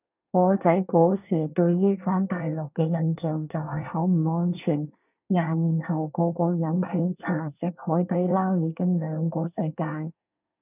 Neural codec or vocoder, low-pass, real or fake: codec, 24 kHz, 1 kbps, SNAC; 3.6 kHz; fake